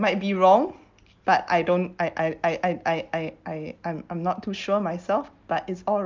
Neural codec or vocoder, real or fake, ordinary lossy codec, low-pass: none; real; Opus, 24 kbps; 7.2 kHz